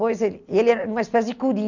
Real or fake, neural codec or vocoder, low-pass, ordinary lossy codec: real; none; 7.2 kHz; none